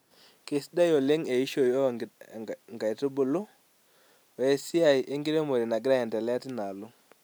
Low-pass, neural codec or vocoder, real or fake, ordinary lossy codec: none; none; real; none